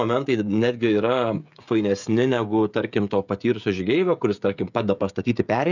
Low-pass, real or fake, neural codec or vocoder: 7.2 kHz; fake; codec, 16 kHz, 16 kbps, FreqCodec, smaller model